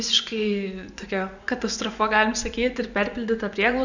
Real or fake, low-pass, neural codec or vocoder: fake; 7.2 kHz; vocoder, 24 kHz, 100 mel bands, Vocos